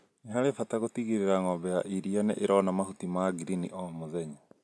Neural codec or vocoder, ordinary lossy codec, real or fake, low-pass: none; none; real; none